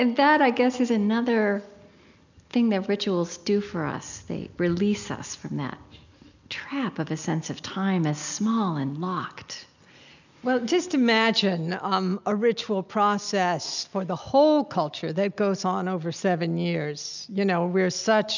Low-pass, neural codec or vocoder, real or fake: 7.2 kHz; none; real